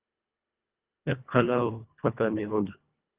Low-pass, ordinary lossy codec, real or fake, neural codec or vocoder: 3.6 kHz; Opus, 24 kbps; fake; codec, 24 kHz, 1.5 kbps, HILCodec